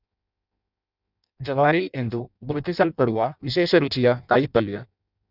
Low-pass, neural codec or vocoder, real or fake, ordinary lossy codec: 5.4 kHz; codec, 16 kHz in and 24 kHz out, 0.6 kbps, FireRedTTS-2 codec; fake; none